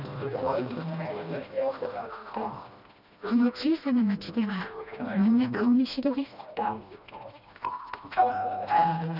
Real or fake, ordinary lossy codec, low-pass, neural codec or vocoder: fake; none; 5.4 kHz; codec, 16 kHz, 1 kbps, FreqCodec, smaller model